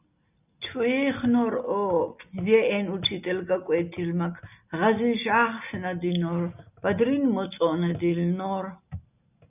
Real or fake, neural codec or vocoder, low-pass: real; none; 3.6 kHz